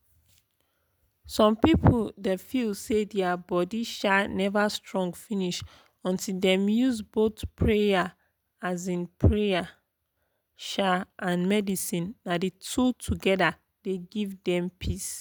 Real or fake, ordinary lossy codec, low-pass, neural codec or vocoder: real; none; none; none